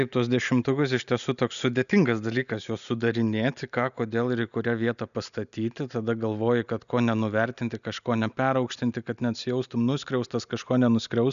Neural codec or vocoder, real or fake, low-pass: none; real; 7.2 kHz